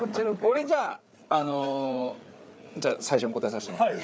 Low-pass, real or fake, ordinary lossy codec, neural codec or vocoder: none; fake; none; codec, 16 kHz, 8 kbps, FreqCodec, larger model